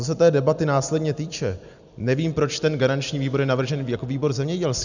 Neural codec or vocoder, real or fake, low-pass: none; real; 7.2 kHz